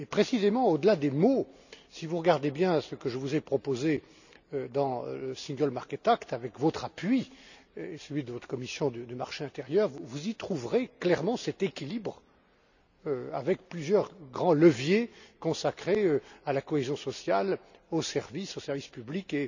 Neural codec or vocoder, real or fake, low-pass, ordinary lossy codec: none; real; 7.2 kHz; none